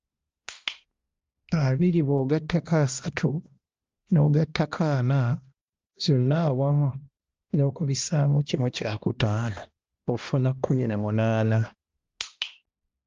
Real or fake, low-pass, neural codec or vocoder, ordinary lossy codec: fake; 7.2 kHz; codec, 16 kHz, 1 kbps, X-Codec, HuBERT features, trained on balanced general audio; Opus, 32 kbps